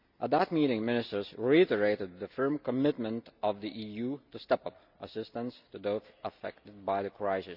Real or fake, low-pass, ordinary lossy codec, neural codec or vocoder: real; 5.4 kHz; none; none